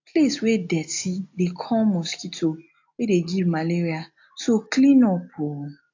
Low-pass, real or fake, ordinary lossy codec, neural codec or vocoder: 7.2 kHz; real; AAC, 48 kbps; none